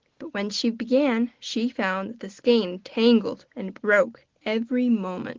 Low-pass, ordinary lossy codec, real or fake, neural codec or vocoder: 7.2 kHz; Opus, 16 kbps; real; none